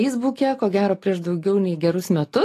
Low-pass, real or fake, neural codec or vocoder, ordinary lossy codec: 14.4 kHz; real; none; AAC, 48 kbps